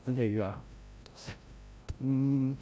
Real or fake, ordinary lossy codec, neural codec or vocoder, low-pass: fake; none; codec, 16 kHz, 0.5 kbps, FreqCodec, larger model; none